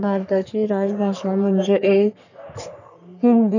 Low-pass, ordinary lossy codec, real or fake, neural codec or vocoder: 7.2 kHz; none; fake; codec, 44.1 kHz, 3.4 kbps, Pupu-Codec